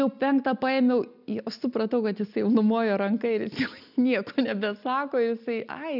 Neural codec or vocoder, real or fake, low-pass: none; real; 5.4 kHz